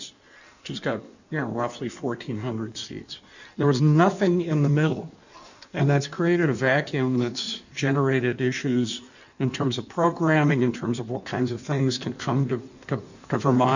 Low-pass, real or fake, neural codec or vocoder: 7.2 kHz; fake; codec, 16 kHz in and 24 kHz out, 1.1 kbps, FireRedTTS-2 codec